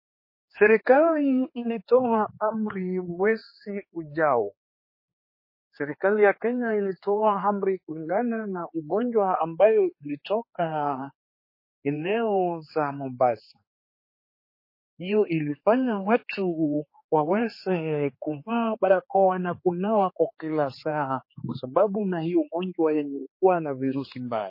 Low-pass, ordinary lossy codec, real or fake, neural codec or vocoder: 5.4 kHz; MP3, 24 kbps; fake; codec, 16 kHz, 4 kbps, X-Codec, HuBERT features, trained on general audio